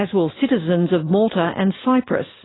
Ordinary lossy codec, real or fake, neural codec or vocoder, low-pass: AAC, 16 kbps; real; none; 7.2 kHz